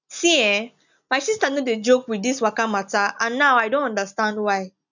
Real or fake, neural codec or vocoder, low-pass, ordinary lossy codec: real; none; 7.2 kHz; none